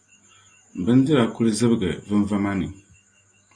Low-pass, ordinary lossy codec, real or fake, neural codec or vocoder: 9.9 kHz; AAC, 48 kbps; real; none